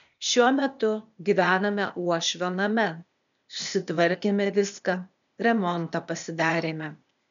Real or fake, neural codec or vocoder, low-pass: fake; codec, 16 kHz, 0.8 kbps, ZipCodec; 7.2 kHz